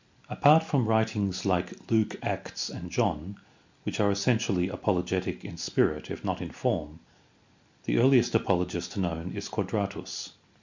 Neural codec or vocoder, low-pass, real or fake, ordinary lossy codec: none; 7.2 kHz; real; MP3, 64 kbps